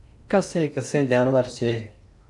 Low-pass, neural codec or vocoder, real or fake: 10.8 kHz; codec, 16 kHz in and 24 kHz out, 0.6 kbps, FocalCodec, streaming, 2048 codes; fake